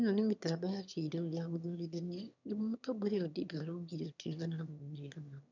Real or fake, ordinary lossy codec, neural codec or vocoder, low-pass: fake; none; autoencoder, 22.05 kHz, a latent of 192 numbers a frame, VITS, trained on one speaker; 7.2 kHz